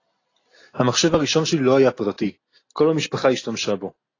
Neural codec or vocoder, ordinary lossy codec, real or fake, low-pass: none; AAC, 32 kbps; real; 7.2 kHz